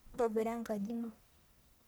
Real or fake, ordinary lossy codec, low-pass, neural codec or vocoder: fake; none; none; codec, 44.1 kHz, 1.7 kbps, Pupu-Codec